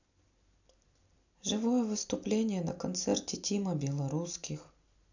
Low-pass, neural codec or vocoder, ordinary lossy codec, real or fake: 7.2 kHz; none; none; real